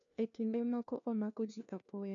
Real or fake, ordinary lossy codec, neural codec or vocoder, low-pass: fake; none; codec, 16 kHz, 1 kbps, FunCodec, trained on LibriTTS, 50 frames a second; 7.2 kHz